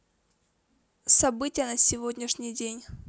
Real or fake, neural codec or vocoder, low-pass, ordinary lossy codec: real; none; none; none